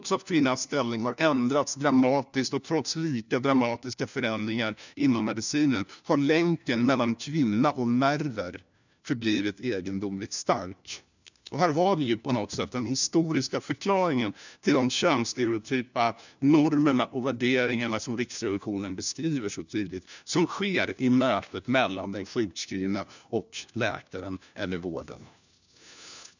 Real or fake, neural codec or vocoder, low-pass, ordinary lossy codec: fake; codec, 16 kHz, 1 kbps, FunCodec, trained on LibriTTS, 50 frames a second; 7.2 kHz; none